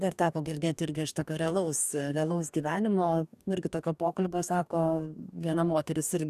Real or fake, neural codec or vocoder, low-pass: fake; codec, 44.1 kHz, 2.6 kbps, DAC; 14.4 kHz